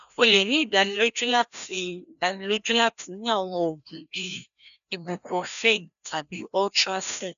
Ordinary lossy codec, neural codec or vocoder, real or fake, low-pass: none; codec, 16 kHz, 1 kbps, FreqCodec, larger model; fake; 7.2 kHz